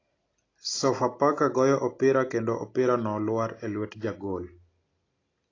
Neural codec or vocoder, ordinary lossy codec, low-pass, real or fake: none; AAC, 32 kbps; 7.2 kHz; real